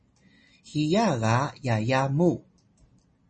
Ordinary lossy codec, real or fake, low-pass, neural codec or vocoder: MP3, 32 kbps; real; 10.8 kHz; none